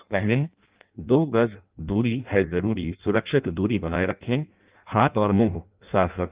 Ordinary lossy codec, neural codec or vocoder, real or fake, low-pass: Opus, 32 kbps; codec, 16 kHz in and 24 kHz out, 0.6 kbps, FireRedTTS-2 codec; fake; 3.6 kHz